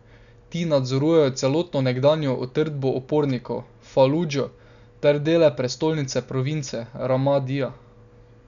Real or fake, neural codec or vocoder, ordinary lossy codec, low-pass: real; none; none; 7.2 kHz